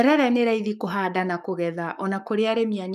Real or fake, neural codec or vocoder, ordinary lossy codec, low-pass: fake; codec, 44.1 kHz, 7.8 kbps, Pupu-Codec; none; 14.4 kHz